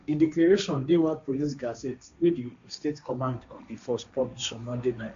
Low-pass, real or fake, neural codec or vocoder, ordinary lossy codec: 7.2 kHz; fake; codec, 16 kHz, 1.1 kbps, Voila-Tokenizer; AAC, 64 kbps